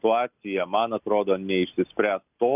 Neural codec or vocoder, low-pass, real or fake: none; 3.6 kHz; real